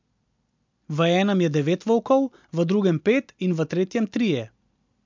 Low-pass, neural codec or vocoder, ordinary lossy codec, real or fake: 7.2 kHz; none; MP3, 64 kbps; real